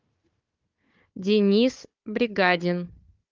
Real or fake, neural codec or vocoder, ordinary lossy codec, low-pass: fake; codec, 16 kHz, 4 kbps, FunCodec, trained on Chinese and English, 50 frames a second; Opus, 32 kbps; 7.2 kHz